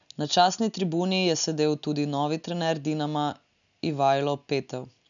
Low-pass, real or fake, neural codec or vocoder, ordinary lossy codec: 7.2 kHz; real; none; none